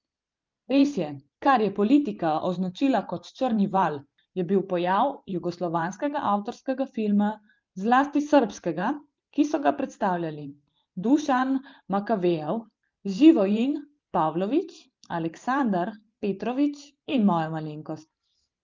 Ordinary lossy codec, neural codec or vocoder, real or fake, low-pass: Opus, 32 kbps; vocoder, 44.1 kHz, 128 mel bands every 512 samples, BigVGAN v2; fake; 7.2 kHz